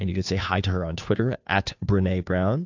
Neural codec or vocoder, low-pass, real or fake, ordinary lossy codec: codec, 16 kHz, 2 kbps, FunCodec, trained on Chinese and English, 25 frames a second; 7.2 kHz; fake; AAC, 48 kbps